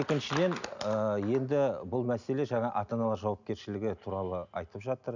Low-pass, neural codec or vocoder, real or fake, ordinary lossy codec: 7.2 kHz; none; real; none